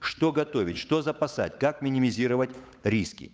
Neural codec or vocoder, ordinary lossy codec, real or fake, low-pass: codec, 16 kHz, 8 kbps, FunCodec, trained on Chinese and English, 25 frames a second; none; fake; none